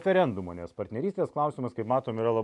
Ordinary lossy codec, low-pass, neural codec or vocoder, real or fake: MP3, 96 kbps; 10.8 kHz; none; real